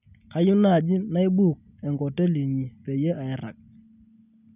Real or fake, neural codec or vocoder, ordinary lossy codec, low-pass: real; none; none; 3.6 kHz